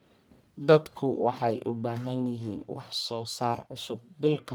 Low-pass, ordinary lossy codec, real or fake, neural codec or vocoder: none; none; fake; codec, 44.1 kHz, 1.7 kbps, Pupu-Codec